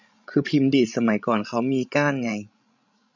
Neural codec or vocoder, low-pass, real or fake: codec, 16 kHz, 16 kbps, FreqCodec, larger model; 7.2 kHz; fake